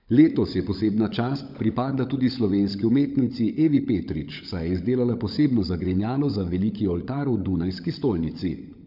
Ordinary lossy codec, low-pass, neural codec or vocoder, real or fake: none; 5.4 kHz; codec, 16 kHz, 16 kbps, FunCodec, trained on LibriTTS, 50 frames a second; fake